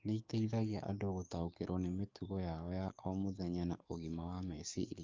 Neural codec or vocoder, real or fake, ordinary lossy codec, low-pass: codec, 44.1 kHz, 7.8 kbps, DAC; fake; Opus, 32 kbps; 7.2 kHz